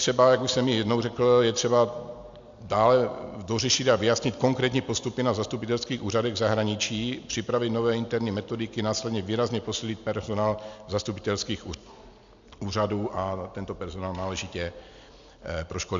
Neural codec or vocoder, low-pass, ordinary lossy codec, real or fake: none; 7.2 kHz; MP3, 64 kbps; real